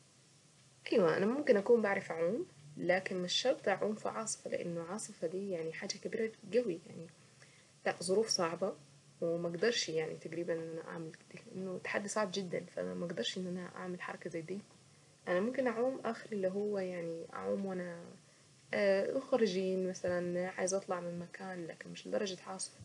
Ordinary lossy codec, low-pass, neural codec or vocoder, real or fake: none; 10.8 kHz; none; real